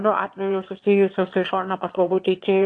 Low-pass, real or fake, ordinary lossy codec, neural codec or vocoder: 9.9 kHz; fake; AAC, 48 kbps; autoencoder, 22.05 kHz, a latent of 192 numbers a frame, VITS, trained on one speaker